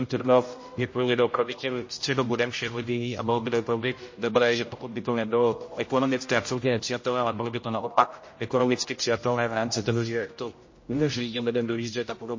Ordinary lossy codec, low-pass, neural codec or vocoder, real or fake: MP3, 32 kbps; 7.2 kHz; codec, 16 kHz, 0.5 kbps, X-Codec, HuBERT features, trained on general audio; fake